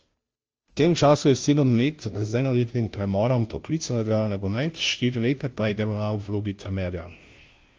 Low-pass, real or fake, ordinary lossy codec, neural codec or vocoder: 7.2 kHz; fake; Opus, 24 kbps; codec, 16 kHz, 0.5 kbps, FunCodec, trained on Chinese and English, 25 frames a second